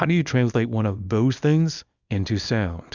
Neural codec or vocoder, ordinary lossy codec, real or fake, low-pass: codec, 24 kHz, 0.9 kbps, WavTokenizer, small release; Opus, 64 kbps; fake; 7.2 kHz